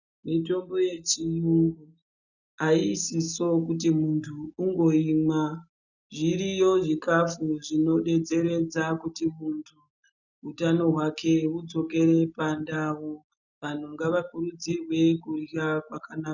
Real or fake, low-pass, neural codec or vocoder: real; 7.2 kHz; none